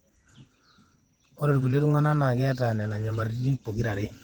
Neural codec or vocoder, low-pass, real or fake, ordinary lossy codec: vocoder, 48 kHz, 128 mel bands, Vocos; 19.8 kHz; fake; Opus, 24 kbps